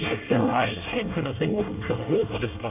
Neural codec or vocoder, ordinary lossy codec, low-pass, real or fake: codec, 24 kHz, 1 kbps, SNAC; none; 3.6 kHz; fake